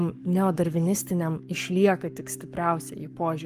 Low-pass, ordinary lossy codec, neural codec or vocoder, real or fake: 14.4 kHz; Opus, 32 kbps; codec, 44.1 kHz, 7.8 kbps, Pupu-Codec; fake